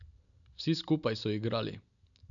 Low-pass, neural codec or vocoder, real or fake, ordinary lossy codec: 7.2 kHz; none; real; none